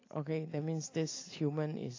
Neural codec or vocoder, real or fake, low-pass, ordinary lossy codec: none; real; 7.2 kHz; AAC, 32 kbps